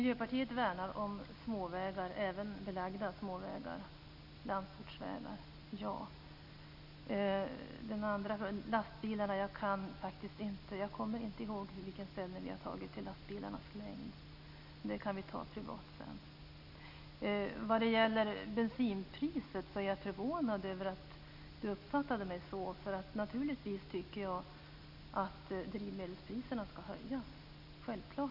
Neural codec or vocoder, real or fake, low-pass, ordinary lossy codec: none; real; 5.4 kHz; none